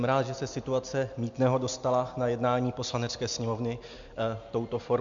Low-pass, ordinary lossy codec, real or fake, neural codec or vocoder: 7.2 kHz; MP3, 64 kbps; real; none